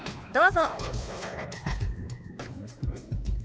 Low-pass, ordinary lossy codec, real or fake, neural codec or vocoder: none; none; fake; codec, 16 kHz, 2 kbps, X-Codec, WavLM features, trained on Multilingual LibriSpeech